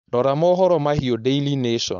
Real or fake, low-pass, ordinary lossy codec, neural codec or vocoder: fake; 7.2 kHz; none; codec, 16 kHz, 4.8 kbps, FACodec